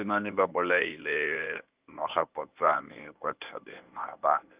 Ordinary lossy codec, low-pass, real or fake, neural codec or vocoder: Opus, 24 kbps; 3.6 kHz; fake; codec, 24 kHz, 0.9 kbps, WavTokenizer, medium speech release version 1